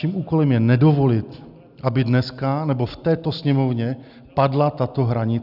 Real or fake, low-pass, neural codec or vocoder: real; 5.4 kHz; none